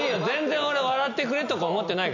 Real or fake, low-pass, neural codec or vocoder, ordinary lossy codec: real; 7.2 kHz; none; none